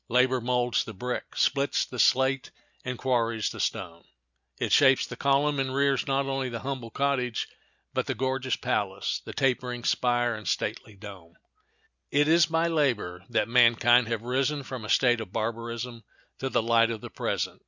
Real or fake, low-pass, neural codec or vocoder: real; 7.2 kHz; none